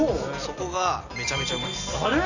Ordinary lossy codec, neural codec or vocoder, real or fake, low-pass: none; none; real; 7.2 kHz